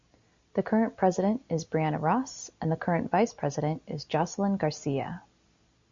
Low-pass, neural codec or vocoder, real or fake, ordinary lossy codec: 7.2 kHz; none; real; Opus, 64 kbps